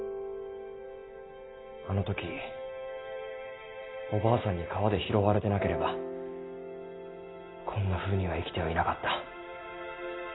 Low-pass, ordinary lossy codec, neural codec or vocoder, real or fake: 7.2 kHz; AAC, 16 kbps; none; real